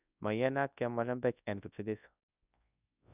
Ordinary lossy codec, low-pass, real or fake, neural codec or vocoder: none; 3.6 kHz; fake; codec, 24 kHz, 0.9 kbps, WavTokenizer, large speech release